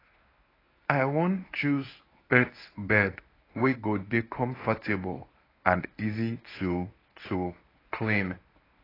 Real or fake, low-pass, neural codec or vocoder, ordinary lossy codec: fake; 5.4 kHz; codec, 24 kHz, 0.9 kbps, WavTokenizer, medium speech release version 1; AAC, 24 kbps